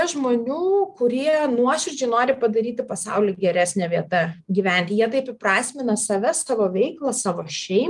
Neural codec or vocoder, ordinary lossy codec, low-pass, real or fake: none; Opus, 32 kbps; 10.8 kHz; real